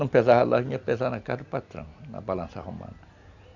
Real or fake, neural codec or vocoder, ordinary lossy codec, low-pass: real; none; none; 7.2 kHz